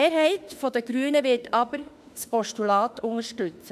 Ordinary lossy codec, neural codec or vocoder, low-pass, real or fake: none; autoencoder, 48 kHz, 32 numbers a frame, DAC-VAE, trained on Japanese speech; 14.4 kHz; fake